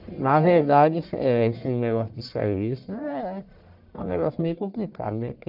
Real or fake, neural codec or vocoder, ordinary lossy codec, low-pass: fake; codec, 44.1 kHz, 1.7 kbps, Pupu-Codec; none; 5.4 kHz